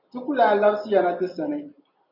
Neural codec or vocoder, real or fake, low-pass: none; real; 5.4 kHz